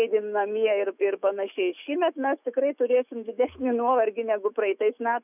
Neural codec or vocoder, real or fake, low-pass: autoencoder, 48 kHz, 128 numbers a frame, DAC-VAE, trained on Japanese speech; fake; 3.6 kHz